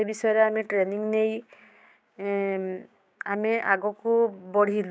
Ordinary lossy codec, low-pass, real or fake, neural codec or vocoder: none; none; fake; codec, 16 kHz, 6 kbps, DAC